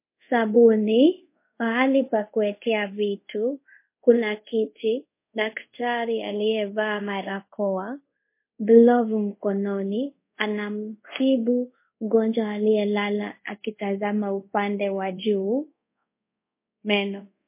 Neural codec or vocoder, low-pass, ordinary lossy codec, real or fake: codec, 24 kHz, 0.5 kbps, DualCodec; 3.6 kHz; MP3, 24 kbps; fake